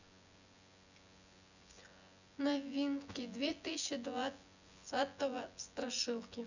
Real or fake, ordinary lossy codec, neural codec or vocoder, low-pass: fake; none; vocoder, 24 kHz, 100 mel bands, Vocos; 7.2 kHz